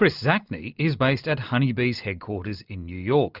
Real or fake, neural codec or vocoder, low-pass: real; none; 5.4 kHz